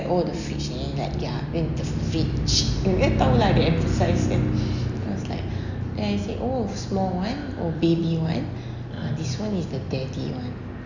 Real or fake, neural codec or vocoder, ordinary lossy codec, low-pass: real; none; none; 7.2 kHz